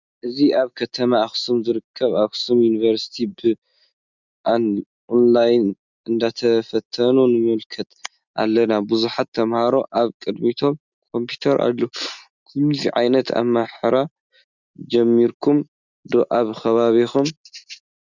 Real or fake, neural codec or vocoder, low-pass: real; none; 7.2 kHz